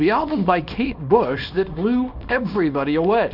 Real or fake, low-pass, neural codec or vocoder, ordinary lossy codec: fake; 5.4 kHz; codec, 24 kHz, 0.9 kbps, WavTokenizer, small release; Opus, 64 kbps